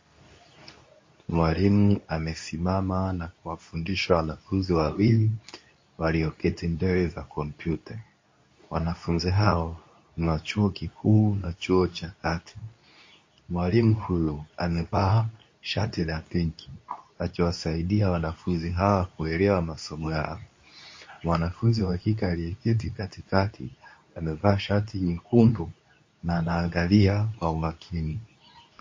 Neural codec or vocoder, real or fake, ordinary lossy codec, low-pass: codec, 24 kHz, 0.9 kbps, WavTokenizer, medium speech release version 2; fake; MP3, 32 kbps; 7.2 kHz